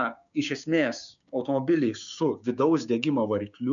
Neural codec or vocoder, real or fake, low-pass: codec, 16 kHz, 6 kbps, DAC; fake; 7.2 kHz